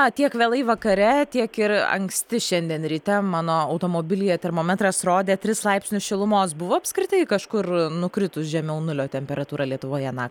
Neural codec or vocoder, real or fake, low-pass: none; real; 19.8 kHz